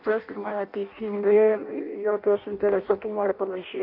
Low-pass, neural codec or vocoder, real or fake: 5.4 kHz; codec, 16 kHz in and 24 kHz out, 0.6 kbps, FireRedTTS-2 codec; fake